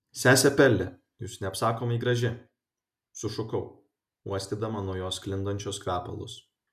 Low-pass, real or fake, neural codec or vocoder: 14.4 kHz; real; none